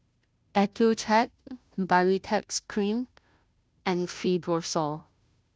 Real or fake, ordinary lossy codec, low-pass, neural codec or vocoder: fake; none; none; codec, 16 kHz, 0.5 kbps, FunCodec, trained on Chinese and English, 25 frames a second